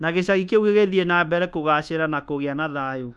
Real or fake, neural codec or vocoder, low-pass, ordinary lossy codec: fake; codec, 24 kHz, 1.2 kbps, DualCodec; none; none